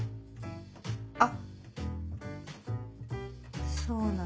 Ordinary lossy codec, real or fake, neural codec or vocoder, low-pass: none; real; none; none